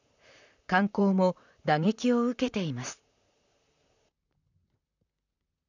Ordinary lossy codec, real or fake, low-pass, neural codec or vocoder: none; fake; 7.2 kHz; vocoder, 44.1 kHz, 128 mel bands, Pupu-Vocoder